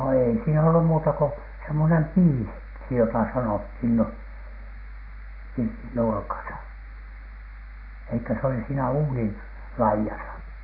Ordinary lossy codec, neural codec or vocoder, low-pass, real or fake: none; none; 5.4 kHz; real